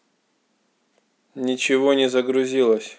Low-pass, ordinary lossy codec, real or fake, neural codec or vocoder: none; none; real; none